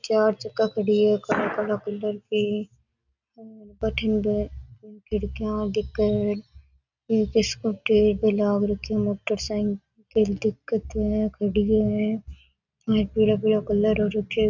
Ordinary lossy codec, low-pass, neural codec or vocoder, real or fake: none; 7.2 kHz; none; real